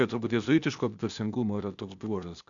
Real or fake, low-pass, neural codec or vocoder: fake; 7.2 kHz; codec, 16 kHz, 0.8 kbps, ZipCodec